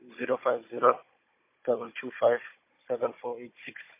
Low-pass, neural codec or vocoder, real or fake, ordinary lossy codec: 3.6 kHz; codec, 16 kHz, 16 kbps, FunCodec, trained on Chinese and English, 50 frames a second; fake; MP3, 24 kbps